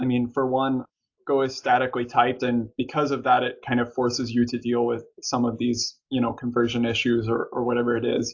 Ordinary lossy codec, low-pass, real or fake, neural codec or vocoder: AAC, 48 kbps; 7.2 kHz; real; none